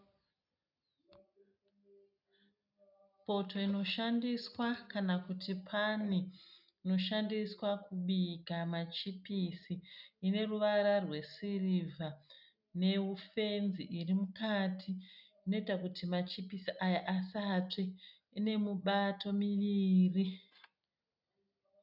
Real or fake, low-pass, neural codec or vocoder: real; 5.4 kHz; none